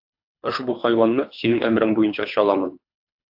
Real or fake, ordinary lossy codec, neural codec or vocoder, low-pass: fake; AAC, 48 kbps; codec, 24 kHz, 3 kbps, HILCodec; 5.4 kHz